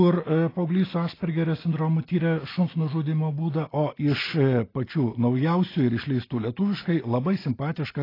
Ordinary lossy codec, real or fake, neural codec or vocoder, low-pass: AAC, 24 kbps; real; none; 5.4 kHz